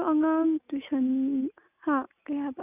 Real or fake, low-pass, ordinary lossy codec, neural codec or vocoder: real; 3.6 kHz; none; none